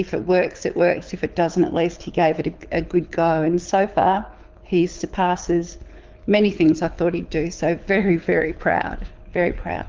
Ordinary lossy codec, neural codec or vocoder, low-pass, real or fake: Opus, 24 kbps; codec, 24 kHz, 6 kbps, HILCodec; 7.2 kHz; fake